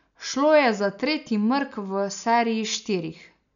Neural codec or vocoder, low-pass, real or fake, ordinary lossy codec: none; 7.2 kHz; real; none